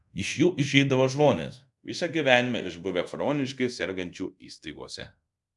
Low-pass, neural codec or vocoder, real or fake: 10.8 kHz; codec, 24 kHz, 0.5 kbps, DualCodec; fake